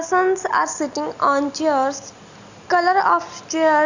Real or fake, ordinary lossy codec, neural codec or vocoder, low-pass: real; Opus, 64 kbps; none; 7.2 kHz